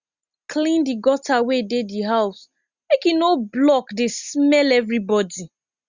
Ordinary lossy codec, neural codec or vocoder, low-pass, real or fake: Opus, 64 kbps; none; 7.2 kHz; real